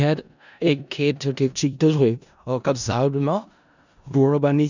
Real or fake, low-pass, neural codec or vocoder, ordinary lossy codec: fake; 7.2 kHz; codec, 16 kHz in and 24 kHz out, 0.4 kbps, LongCat-Audio-Codec, four codebook decoder; none